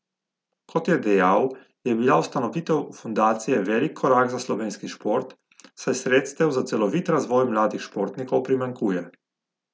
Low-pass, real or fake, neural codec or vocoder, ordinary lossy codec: none; real; none; none